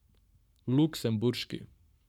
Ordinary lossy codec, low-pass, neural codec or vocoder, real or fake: none; 19.8 kHz; codec, 44.1 kHz, 7.8 kbps, Pupu-Codec; fake